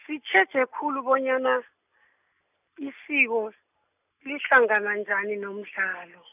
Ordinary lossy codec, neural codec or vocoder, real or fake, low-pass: none; none; real; 3.6 kHz